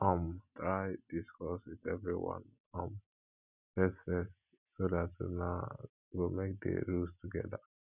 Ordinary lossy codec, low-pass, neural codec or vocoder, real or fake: none; 3.6 kHz; none; real